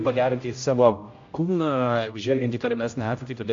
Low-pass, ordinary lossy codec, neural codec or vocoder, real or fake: 7.2 kHz; AAC, 48 kbps; codec, 16 kHz, 0.5 kbps, X-Codec, HuBERT features, trained on general audio; fake